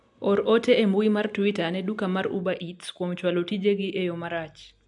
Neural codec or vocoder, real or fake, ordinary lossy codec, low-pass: none; real; AAC, 64 kbps; 10.8 kHz